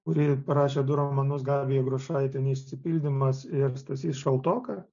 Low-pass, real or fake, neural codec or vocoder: 7.2 kHz; real; none